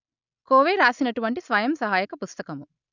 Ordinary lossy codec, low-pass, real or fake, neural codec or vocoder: none; 7.2 kHz; real; none